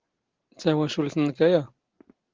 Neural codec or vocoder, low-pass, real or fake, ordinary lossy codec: none; 7.2 kHz; real; Opus, 16 kbps